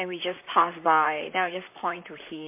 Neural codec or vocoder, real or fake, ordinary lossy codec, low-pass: vocoder, 44.1 kHz, 128 mel bands, Pupu-Vocoder; fake; MP3, 32 kbps; 3.6 kHz